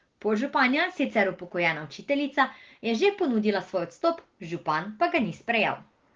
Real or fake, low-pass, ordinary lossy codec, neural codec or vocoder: real; 7.2 kHz; Opus, 16 kbps; none